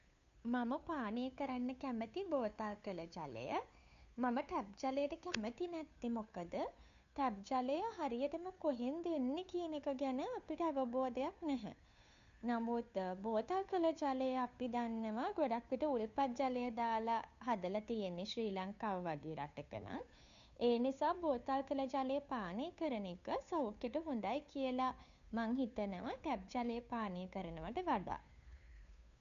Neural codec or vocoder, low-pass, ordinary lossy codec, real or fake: codec, 16 kHz, 2 kbps, FunCodec, trained on Chinese and English, 25 frames a second; 7.2 kHz; none; fake